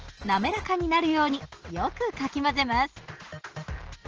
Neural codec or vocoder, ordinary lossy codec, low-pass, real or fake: none; Opus, 16 kbps; 7.2 kHz; real